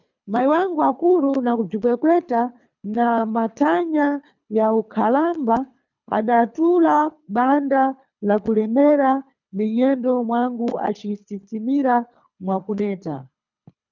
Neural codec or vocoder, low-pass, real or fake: codec, 24 kHz, 3 kbps, HILCodec; 7.2 kHz; fake